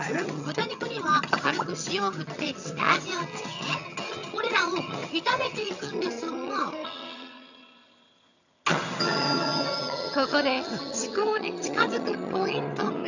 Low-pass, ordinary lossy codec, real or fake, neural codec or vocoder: 7.2 kHz; none; fake; vocoder, 22.05 kHz, 80 mel bands, HiFi-GAN